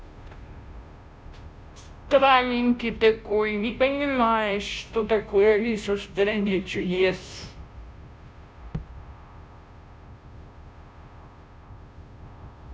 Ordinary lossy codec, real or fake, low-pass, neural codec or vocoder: none; fake; none; codec, 16 kHz, 0.5 kbps, FunCodec, trained on Chinese and English, 25 frames a second